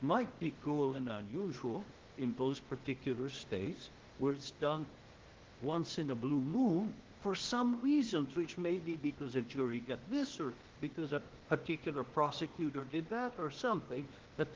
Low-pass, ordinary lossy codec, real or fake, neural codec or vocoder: 7.2 kHz; Opus, 16 kbps; fake; codec, 16 kHz, 0.8 kbps, ZipCodec